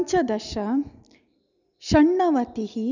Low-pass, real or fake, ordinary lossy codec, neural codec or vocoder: 7.2 kHz; real; none; none